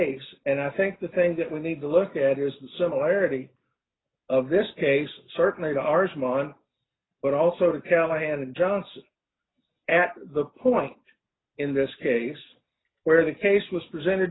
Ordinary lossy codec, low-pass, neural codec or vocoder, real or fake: AAC, 16 kbps; 7.2 kHz; none; real